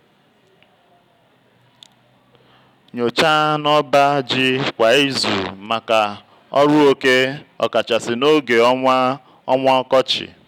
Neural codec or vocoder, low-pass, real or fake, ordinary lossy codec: none; 19.8 kHz; real; none